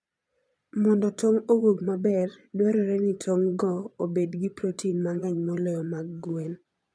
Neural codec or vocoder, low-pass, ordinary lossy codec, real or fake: vocoder, 22.05 kHz, 80 mel bands, Vocos; none; none; fake